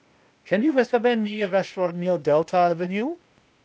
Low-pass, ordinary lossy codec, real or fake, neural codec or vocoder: none; none; fake; codec, 16 kHz, 0.8 kbps, ZipCodec